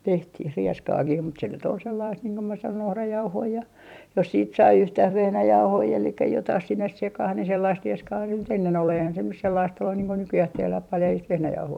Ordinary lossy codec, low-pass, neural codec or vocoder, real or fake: none; 19.8 kHz; vocoder, 44.1 kHz, 128 mel bands every 256 samples, BigVGAN v2; fake